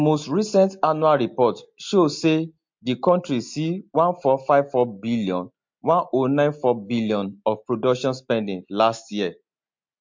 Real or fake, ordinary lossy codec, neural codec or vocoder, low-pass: real; MP3, 48 kbps; none; 7.2 kHz